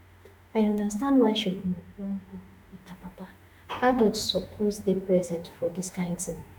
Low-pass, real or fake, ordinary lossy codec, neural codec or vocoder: none; fake; none; autoencoder, 48 kHz, 32 numbers a frame, DAC-VAE, trained on Japanese speech